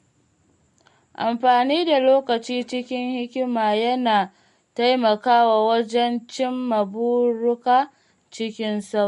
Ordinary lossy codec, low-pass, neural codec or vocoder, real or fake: MP3, 48 kbps; 14.4 kHz; none; real